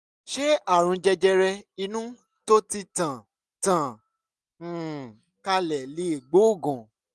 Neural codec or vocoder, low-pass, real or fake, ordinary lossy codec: none; none; real; none